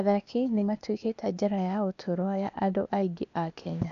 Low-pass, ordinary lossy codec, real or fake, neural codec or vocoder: 7.2 kHz; none; fake; codec, 16 kHz, 0.8 kbps, ZipCodec